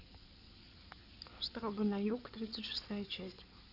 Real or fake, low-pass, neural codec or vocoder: fake; 5.4 kHz; codec, 16 kHz in and 24 kHz out, 2.2 kbps, FireRedTTS-2 codec